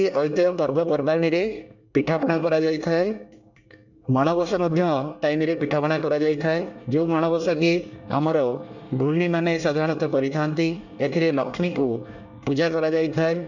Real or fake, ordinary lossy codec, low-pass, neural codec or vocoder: fake; none; 7.2 kHz; codec, 24 kHz, 1 kbps, SNAC